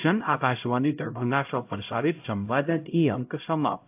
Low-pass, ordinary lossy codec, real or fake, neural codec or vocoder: 3.6 kHz; AAC, 32 kbps; fake; codec, 16 kHz, 0.5 kbps, X-Codec, HuBERT features, trained on LibriSpeech